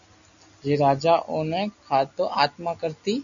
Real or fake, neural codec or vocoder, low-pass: real; none; 7.2 kHz